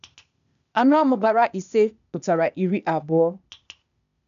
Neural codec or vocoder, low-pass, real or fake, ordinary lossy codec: codec, 16 kHz, 0.8 kbps, ZipCodec; 7.2 kHz; fake; none